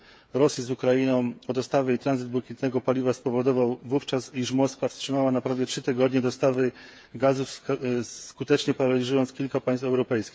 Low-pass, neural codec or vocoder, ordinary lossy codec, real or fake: none; codec, 16 kHz, 8 kbps, FreqCodec, smaller model; none; fake